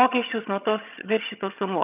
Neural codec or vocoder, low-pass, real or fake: vocoder, 22.05 kHz, 80 mel bands, HiFi-GAN; 3.6 kHz; fake